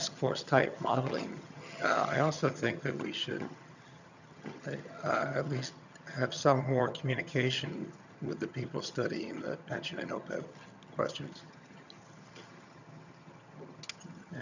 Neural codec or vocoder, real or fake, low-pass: vocoder, 22.05 kHz, 80 mel bands, HiFi-GAN; fake; 7.2 kHz